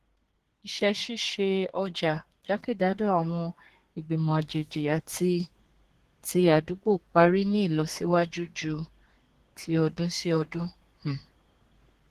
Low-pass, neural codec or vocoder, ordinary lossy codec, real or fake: 14.4 kHz; codec, 32 kHz, 1.9 kbps, SNAC; Opus, 16 kbps; fake